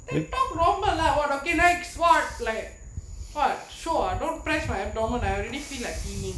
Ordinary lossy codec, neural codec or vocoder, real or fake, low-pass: none; none; real; none